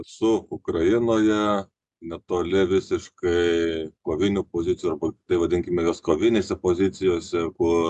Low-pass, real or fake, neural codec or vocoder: 10.8 kHz; real; none